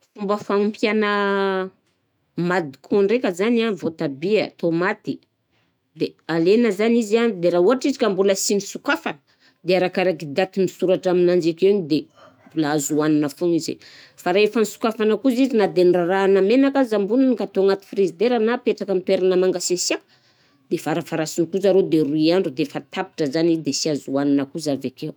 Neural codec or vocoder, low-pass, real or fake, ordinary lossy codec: autoencoder, 48 kHz, 128 numbers a frame, DAC-VAE, trained on Japanese speech; none; fake; none